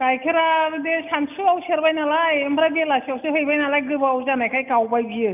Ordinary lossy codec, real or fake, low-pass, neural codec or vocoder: none; real; 3.6 kHz; none